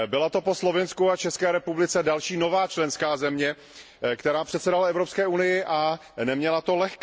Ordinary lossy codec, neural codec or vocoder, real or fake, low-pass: none; none; real; none